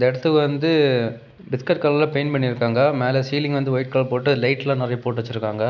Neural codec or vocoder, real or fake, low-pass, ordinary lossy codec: none; real; 7.2 kHz; none